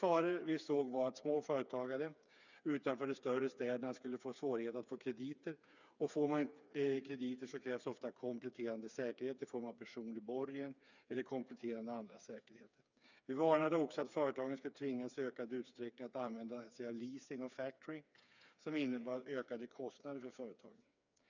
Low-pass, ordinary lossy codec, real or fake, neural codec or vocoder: 7.2 kHz; none; fake; codec, 16 kHz, 4 kbps, FreqCodec, smaller model